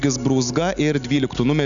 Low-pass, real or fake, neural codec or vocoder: 7.2 kHz; real; none